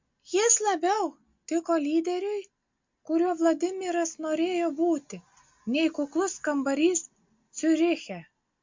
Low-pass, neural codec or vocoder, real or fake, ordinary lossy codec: 7.2 kHz; none; real; MP3, 48 kbps